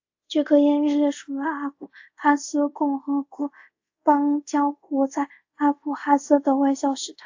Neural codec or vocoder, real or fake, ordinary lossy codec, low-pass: codec, 24 kHz, 0.5 kbps, DualCodec; fake; AAC, 48 kbps; 7.2 kHz